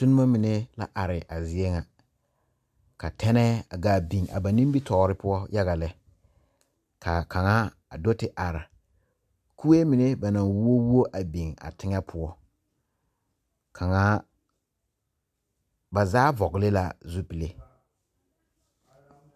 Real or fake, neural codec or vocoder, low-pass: real; none; 14.4 kHz